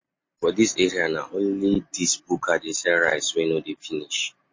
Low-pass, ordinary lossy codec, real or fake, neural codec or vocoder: 7.2 kHz; MP3, 32 kbps; real; none